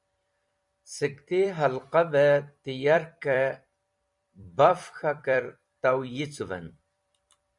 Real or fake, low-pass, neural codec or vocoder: fake; 10.8 kHz; vocoder, 44.1 kHz, 128 mel bands every 256 samples, BigVGAN v2